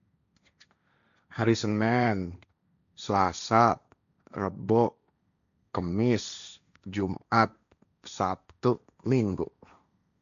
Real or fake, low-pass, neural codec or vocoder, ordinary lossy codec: fake; 7.2 kHz; codec, 16 kHz, 1.1 kbps, Voila-Tokenizer; none